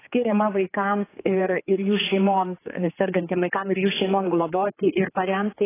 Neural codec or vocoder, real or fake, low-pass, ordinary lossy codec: codec, 16 kHz, 4 kbps, X-Codec, HuBERT features, trained on general audio; fake; 3.6 kHz; AAC, 16 kbps